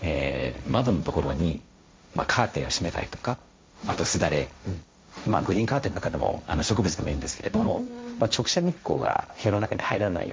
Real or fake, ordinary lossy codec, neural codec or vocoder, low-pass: fake; none; codec, 16 kHz, 1.1 kbps, Voila-Tokenizer; none